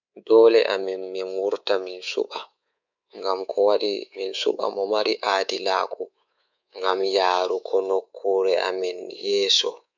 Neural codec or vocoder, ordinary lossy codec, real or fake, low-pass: codec, 24 kHz, 3.1 kbps, DualCodec; none; fake; 7.2 kHz